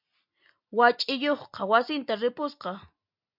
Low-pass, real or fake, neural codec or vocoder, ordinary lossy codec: 5.4 kHz; real; none; MP3, 48 kbps